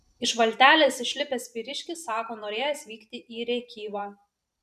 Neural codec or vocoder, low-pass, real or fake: vocoder, 44.1 kHz, 128 mel bands every 512 samples, BigVGAN v2; 14.4 kHz; fake